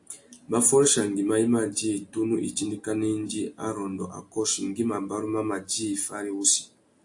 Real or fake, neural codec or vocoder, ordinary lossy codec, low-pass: real; none; AAC, 64 kbps; 10.8 kHz